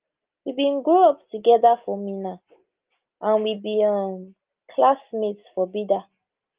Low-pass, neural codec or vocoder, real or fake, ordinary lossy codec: 3.6 kHz; none; real; Opus, 32 kbps